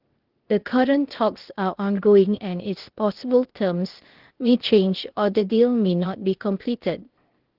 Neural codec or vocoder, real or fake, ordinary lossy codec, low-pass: codec, 16 kHz, 0.8 kbps, ZipCodec; fake; Opus, 16 kbps; 5.4 kHz